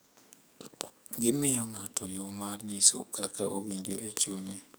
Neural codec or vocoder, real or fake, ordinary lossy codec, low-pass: codec, 44.1 kHz, 2.6 kbps, SNAC; fake; none; none